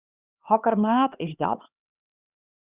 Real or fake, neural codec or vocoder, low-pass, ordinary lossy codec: fake; codec, 16 kHz, 2 kbps, X-Codec, HuBERT features, trained on LibriSpeech; 3.6 kHz; Opus, 64 kbps